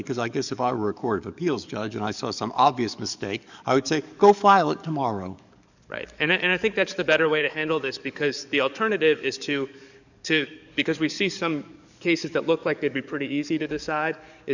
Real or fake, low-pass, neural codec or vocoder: fake; 7.2 kHz; codec, 44.1 kHz, 7.8 kbps, DAC